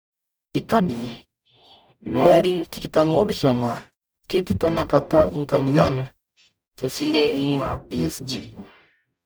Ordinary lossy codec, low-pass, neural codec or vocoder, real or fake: none; none; codec, 44.1 kHz, 0.9 kbps, DAC; fake